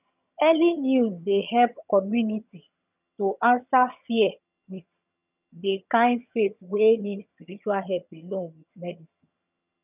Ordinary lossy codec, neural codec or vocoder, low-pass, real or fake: none; vocoder, 22.05 kHz, 80 mel bands, HiFi-GAN; 3.6 kHz; fake